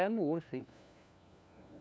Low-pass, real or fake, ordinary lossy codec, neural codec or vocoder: none; fake; none; codec, 16 kHz, 1 kbps, FunCodec, trained on LibriTTS, 50 frames a second